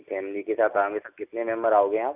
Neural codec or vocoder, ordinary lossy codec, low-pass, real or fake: none; none; 3.6 kHz; real